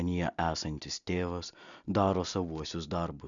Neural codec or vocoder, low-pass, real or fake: none; 7.2 kHz; real